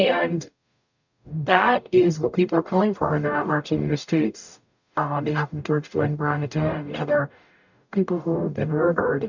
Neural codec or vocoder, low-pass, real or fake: codec, 44.1 kHz, 0.9 kbps, DAC; 7.2 kHz; fake